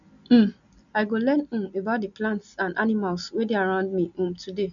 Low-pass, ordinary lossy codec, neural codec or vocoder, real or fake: 7.2 kHz; none; none; real